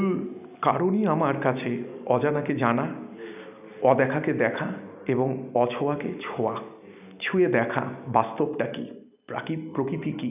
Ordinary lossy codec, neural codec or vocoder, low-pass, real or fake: none; none; 3.6 kHz; real